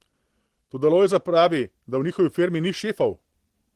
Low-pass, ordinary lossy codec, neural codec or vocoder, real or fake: 14.4 kHz; Opus, 16 kbps; none; real